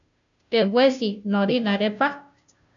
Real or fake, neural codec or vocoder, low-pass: fake; codec, 16 kHz, 0.5 kbps, FunCodec, trained on Chinese and English, 25 frames a second; 7.2 kHz